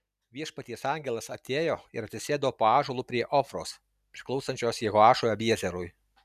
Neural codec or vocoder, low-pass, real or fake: none; 14.4 kHz; real